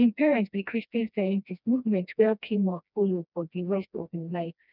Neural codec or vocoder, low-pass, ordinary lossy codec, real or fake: codec, 16 kHz, 1 kbps, FreqCodec, smaller model; 5.4 kHz; none; fake